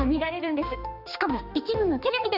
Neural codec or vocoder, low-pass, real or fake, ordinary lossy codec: codec, 16 kHz, 2 kbps, X-Codec, HuBERT features, trained on general audio; 5.4 kHz; fake; none